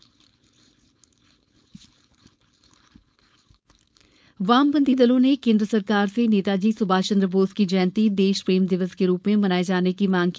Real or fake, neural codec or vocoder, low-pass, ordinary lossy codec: fake; codec, 16 kHz, 4.8 kbps, FACodec; none; none